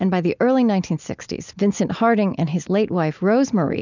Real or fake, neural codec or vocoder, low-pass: real; none; 7.2 kHz